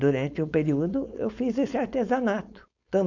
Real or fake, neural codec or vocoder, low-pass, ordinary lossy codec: fake; codec, 16 kHz, 4.8 kbps, FACodec; 7.2 kHz; none